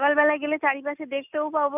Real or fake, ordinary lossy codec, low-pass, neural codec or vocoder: real; none; 3.6 kHz; none